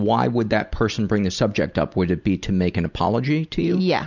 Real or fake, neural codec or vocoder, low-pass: real; none; 7.2 kHz